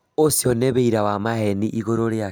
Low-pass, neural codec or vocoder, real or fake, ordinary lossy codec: none; none; real; none